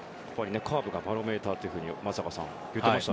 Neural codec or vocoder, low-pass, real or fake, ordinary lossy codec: none; none; real; none